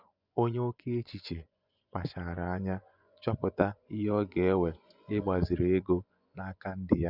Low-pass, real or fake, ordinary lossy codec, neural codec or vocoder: 5.4 kHz; fake; none; vocoder, 24 kHz, 100 mel bands, Vocos